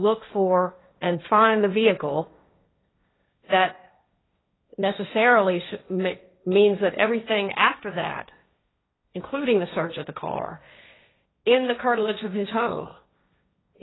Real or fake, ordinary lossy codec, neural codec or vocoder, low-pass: fake; AAC, 16 kbps; codec, 16 kHz, 1.1 kbps, Voila-Tokenizer; 7.2 kHz